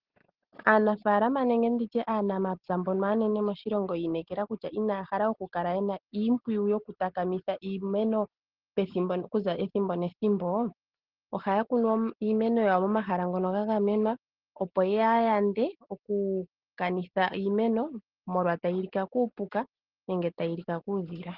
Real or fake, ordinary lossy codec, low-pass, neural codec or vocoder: real; Opus, 16 kbps; 5.4 kHz; none